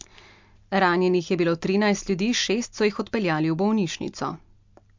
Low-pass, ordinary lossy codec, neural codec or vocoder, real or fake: 7.2 kHz; MP3, 64 kbps; none; real